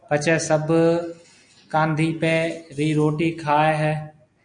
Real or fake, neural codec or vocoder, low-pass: real; none; 9.9 kHz